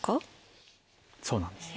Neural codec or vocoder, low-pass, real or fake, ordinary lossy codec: none; none; real; none